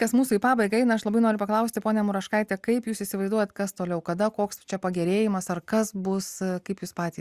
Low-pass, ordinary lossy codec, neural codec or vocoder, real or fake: 14.4 kHz; Opus, 64 kbps; none; real